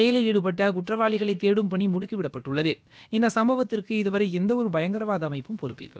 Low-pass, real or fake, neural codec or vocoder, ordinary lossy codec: none; fake; codec, 16 kHz, about 1 kbps, DyCAST, with the encoder's durations; none